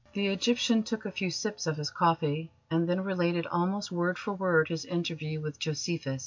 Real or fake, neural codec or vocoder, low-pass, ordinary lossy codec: real; none; 7.2 kHz; MP3, 48 kbps